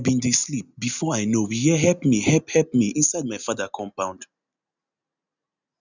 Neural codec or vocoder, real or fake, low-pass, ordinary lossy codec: none; real; 7.2 kHz; none